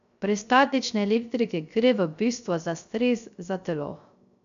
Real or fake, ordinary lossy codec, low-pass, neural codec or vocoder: fake; none; 7.2 kHz; codec, 16 kHz, 0.3 kbps, FocalCodec